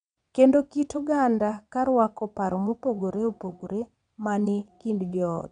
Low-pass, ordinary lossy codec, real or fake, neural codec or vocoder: 9.9 kHz; none; fake; vocoder, 22.05 kHz, 80 mel bands, WaveNeXt